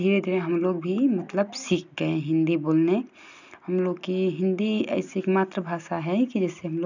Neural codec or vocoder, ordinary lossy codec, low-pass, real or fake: none; none; 7.2 kHz; real